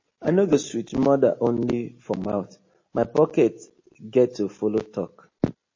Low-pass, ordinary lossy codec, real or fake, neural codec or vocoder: 7.2 kHz; MP3, 32 kbps; real; none